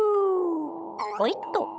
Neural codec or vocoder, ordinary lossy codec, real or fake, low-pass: codec, 16 kHz, 16 kbps, FunCodec, trained on Chinese and English, 50 frames a second; none; fake; none